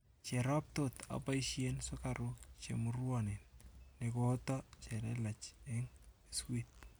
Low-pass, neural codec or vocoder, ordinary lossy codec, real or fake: none; none; none; real